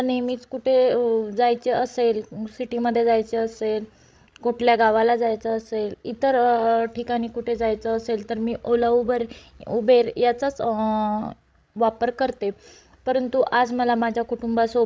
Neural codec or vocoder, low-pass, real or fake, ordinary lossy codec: codec, 16 kHz, 16 kbps, FreqCodec, larger model; none; fake; none